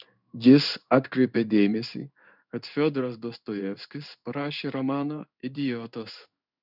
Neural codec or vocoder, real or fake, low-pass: codec, 16 kHz in and 24 kHz out, 1 kbps, XY-Tokenizer; fake; 5.4 kHz